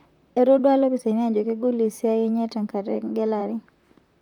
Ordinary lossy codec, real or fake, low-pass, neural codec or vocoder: none; fake; 19.8 kHz; vocoder, 44.1 kHz, 128 mel bands, Pupu-Vocoder